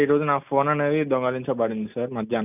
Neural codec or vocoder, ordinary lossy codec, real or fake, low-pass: none; none; real; 3.6 kHz